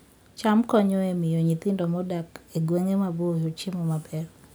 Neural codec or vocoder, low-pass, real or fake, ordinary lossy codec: none; none; real; none